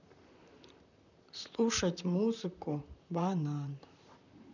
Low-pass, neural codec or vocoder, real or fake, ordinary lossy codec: 7.2 kHz; vocoder, 44.1 kHz, 128 mel bands, Pupu-Vocoder; fake; none